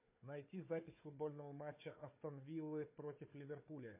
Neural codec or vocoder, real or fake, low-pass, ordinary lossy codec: codec, 16 kHz, 4 kbps, FunCodec, trained on Chinese and English, 50 frames a second; fake; 3.6 kHz; MP3, 24 kbps